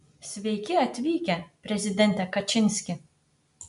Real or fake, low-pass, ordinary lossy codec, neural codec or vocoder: real; 10.8 kHz; MP3, 64 kbps; none